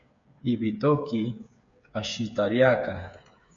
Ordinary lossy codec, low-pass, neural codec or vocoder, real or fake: MP3, 64 kbps; 7.2 kHz; codec, 16 kHz, 8 kbps, FreqCodec, smaller model; fake